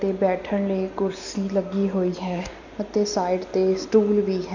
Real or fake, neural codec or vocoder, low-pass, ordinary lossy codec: real; none; 7.2 kHz; none